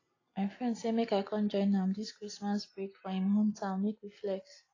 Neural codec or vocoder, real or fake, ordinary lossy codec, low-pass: none; real; AAC, 32 kbps; 7.2 kHz